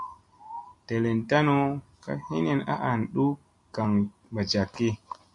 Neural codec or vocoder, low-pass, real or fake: none; 10.8 kHz; real